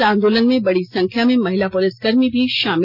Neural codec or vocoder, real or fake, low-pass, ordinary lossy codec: none; real; 5.4 kHz; none